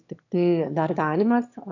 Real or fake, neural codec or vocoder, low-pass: fake; autoencoder, 22.05 kHz, a latent of 192 numbers a frame, VITS, trained on one speaker; 7.2 kHz